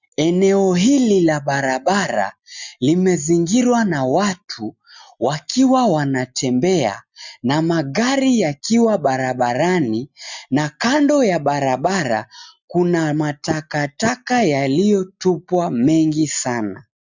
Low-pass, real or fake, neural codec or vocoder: 7.2 kHz; real; none